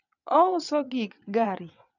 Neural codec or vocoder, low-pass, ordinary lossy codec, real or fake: vocoder, 22.05 kHz, 80 mel bands, WaveNeXt; 7.2 kHz; none; fake